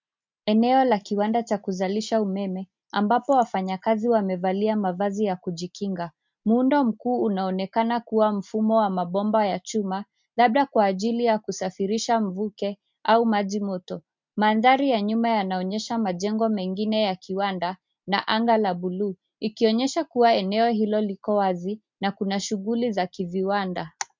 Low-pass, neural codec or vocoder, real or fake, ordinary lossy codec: 7.2 kHz; none; real; MP3, 64 kbps